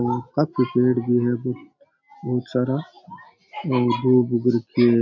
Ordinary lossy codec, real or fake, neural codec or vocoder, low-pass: none; real; none; 7.2 kHz